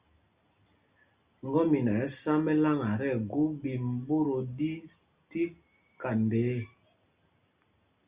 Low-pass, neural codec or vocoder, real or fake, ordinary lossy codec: 3.6 kHz; none; real; Opus, 64 kbps